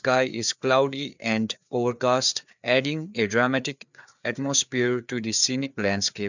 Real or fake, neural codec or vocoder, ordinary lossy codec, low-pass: fake; codec, 16 kHz, 4 kbps, FreqCodec, larger model; none; 7.2 kHz